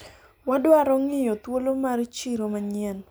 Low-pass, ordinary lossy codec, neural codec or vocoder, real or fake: none; none; none; real